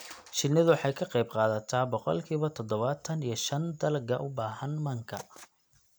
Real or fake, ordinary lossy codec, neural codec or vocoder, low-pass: real; none; none; none